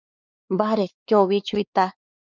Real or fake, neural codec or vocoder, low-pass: fake; codec, 16 kHz, 4 kbps, X-Codec, WavLM features, trained on Multilingual LibriSpeech; 7.2 kHz